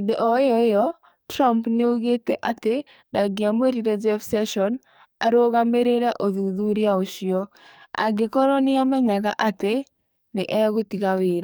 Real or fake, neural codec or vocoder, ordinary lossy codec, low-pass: fake; codec, 44.1 kHz, 2.6 kbps, SNAC; none; none